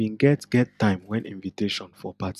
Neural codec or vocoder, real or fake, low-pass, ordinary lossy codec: none; real; 14.4 kHz; none